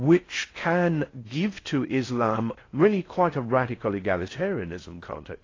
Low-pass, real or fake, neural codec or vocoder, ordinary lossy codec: 7.2 kHz; fake; codec, 16 kHz in and 24 kHz out, 0.6 kbps, FocalCodec, streaming, 4096 codes; AAC, 32 kbps